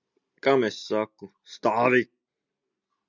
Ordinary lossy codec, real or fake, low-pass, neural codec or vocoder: Opus, 64 kbps; real; 7.2 kHz; none